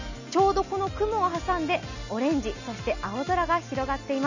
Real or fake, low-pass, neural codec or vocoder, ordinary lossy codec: real; 7.2 kHz; none; none